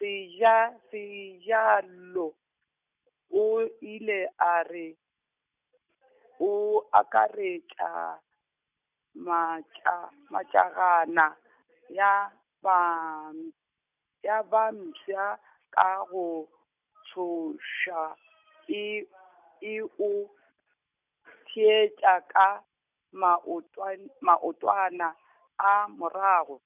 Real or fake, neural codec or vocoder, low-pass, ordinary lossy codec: real; none; 3.6 kHz; none